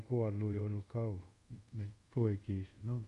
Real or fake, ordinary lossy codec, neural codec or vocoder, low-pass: fake; Opus, 64 kbps; codec, 24 kHz, 0.5 kbps, DualCodec; 10.8 kHz